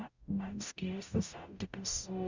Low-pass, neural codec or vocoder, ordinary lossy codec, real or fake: 7.2 kHz; codec, 44.1 kHz, 0.9 kbps, DAC; Opus, 64 kbps; fake